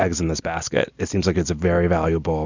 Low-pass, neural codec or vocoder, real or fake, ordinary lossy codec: 7.2 kHz; none; real; Opus, 64 kbps